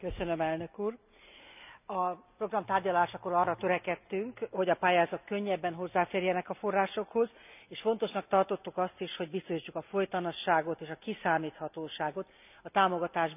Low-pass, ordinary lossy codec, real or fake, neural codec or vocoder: 3.6 kHz; none; real; none